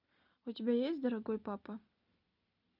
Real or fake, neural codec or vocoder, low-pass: real; none; 5.4 kHz